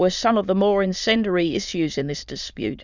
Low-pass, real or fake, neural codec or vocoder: 7.2 kHz; fake; autoencoder, 22.05 kHz, a latent of 192 numbers a frame, VITS, trained on many speakers